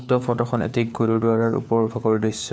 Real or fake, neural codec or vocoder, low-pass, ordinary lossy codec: fake; codec, 16 kHz, 4 kbps, FunCodec, trained on LibriTTS, 50 frames a second; none; none